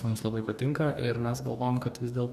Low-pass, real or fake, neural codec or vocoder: 14.4 kHz; fake; codec, 44.1 kHz, 2.6 kbps, DAC